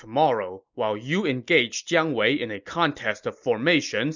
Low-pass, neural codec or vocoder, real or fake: 7.2 kHz; none; real